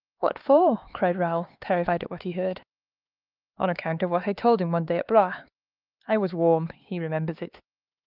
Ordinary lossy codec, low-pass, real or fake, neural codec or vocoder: Opus, 32 kbps; 5.4 kHz; fake; codec, 16 kHz, 4 kbps, X-Codec, HuBERT features, trained on LibriSpeech